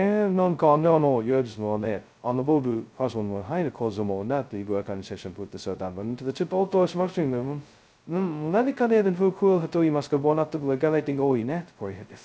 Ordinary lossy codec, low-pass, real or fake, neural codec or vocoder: none; none; fake; codec, 16 kHz, 0.2 kbps, FocalCodec